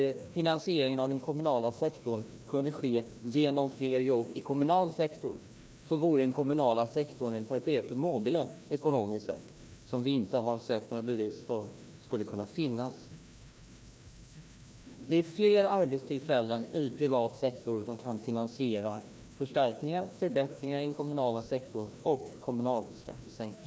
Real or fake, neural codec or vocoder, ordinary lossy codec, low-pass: fake; codec, 16 kHz, 1 kbps, FreqCodec, larger model; none; none